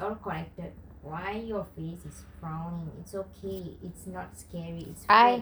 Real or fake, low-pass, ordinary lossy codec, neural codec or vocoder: real; none; none; none